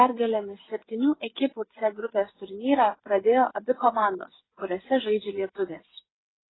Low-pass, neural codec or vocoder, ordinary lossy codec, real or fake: 7.2 kHz; codec, 16 kHz, 8 kbps, FreqCodec, smaller model; AAC, 16 kbps; fake